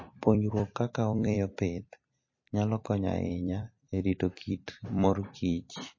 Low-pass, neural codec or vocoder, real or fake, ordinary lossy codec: 7.2 kHz; vocoder, 44.1 kHz, 128 mel bands every 256 samples, BigVGAN v2; fake; MP3, 32 kbps